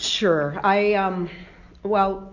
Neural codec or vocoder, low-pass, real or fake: none; 7.2 kHz; real